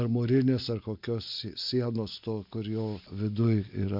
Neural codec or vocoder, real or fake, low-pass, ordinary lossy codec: none; real; 5.4 kHz; MP3, 48 kbps